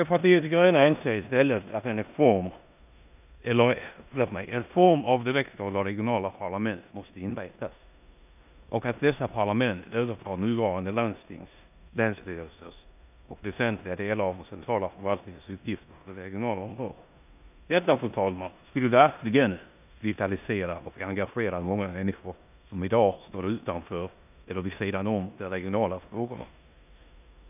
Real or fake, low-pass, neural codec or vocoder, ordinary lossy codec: fake; 3.6 kHz; codec, 16 kHz in and 24 kHz out, 0.9 kbps, LongCat-Audio-Codec, four codebook decoder; none